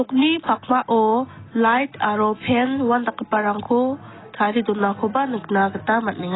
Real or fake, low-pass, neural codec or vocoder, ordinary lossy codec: real; 7.2 kHz; none; AAC, 16 kbps